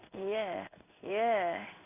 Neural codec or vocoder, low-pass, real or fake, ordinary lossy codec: codec, 16 kHz in and 24 kHz out, 1 kbps, XY-Tokenizer; 3.6 kHz; fake; none